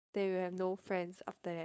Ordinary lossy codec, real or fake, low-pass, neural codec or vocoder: none; fake; none; codec, 16 kHz, 4.8 kbps, FACodec